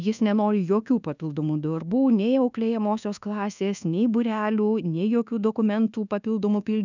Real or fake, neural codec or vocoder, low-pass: fake; codec, 24 kHz, 1.2 kbps, DualCodec; 7.2 kHz